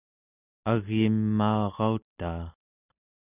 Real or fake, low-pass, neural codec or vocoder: real; 3.6 kHz; none